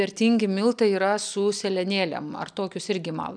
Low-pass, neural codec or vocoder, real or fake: 9.9 kHz; none; real